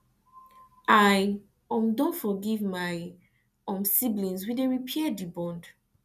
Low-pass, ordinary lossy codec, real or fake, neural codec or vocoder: 14.4 kHz; none; real; none